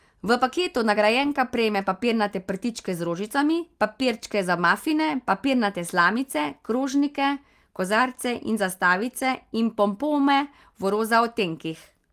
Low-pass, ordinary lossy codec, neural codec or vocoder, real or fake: 14.4 kHz; Opus, 24 kbps; none; real